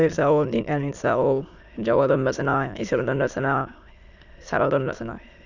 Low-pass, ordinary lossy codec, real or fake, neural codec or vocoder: 7.2 kHz; none; fake; autoencoder, 22.05 kHz, a latent of 192 numbers a frame, VITS, trained on many speakers